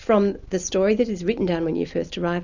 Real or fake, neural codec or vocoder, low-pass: real; none; 7.2 kHz